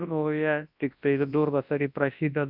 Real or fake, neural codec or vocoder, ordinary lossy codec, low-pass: fake; codec, 24 kHz, 0.9 kbps, WavTokenizer, large speech release; AAC, 48 kbps; 5.4 kHz